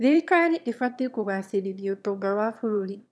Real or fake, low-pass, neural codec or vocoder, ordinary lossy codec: fake; none; autoencoder, 22.05 kHz, a latent of 192 numbers a frame, VITS, trained on one speaker; none